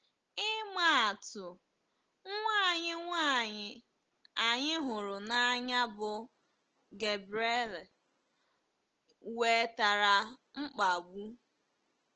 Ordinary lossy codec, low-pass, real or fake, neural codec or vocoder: Opus, 16 kbps; 7.2 kHz; real; none